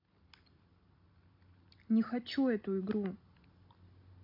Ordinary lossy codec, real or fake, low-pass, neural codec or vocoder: AAC, 48 kbps; real; 5.4 kHz; none